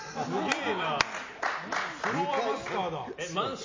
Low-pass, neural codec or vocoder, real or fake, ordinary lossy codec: 7.2 kHz; none; real; MP3, 32 kbps